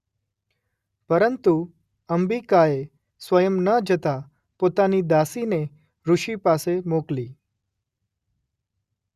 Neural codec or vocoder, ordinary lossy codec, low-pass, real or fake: none; Opus, 64 kbps; 14.4 kHz; real